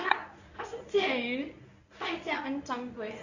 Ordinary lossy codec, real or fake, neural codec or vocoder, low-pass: none; fake; codec, 24 kHz, 0.9 kbps, WavTokenizer, medium speech release version 1; 7.2 kHz